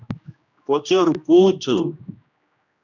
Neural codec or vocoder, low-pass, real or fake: codec, 16 kHz, 1 kbps, X-Codec, HuBERT features, trained on general audio; 7.2 kHz; fake